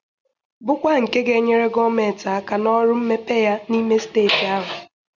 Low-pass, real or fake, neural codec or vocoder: 7.2 kHz; real; none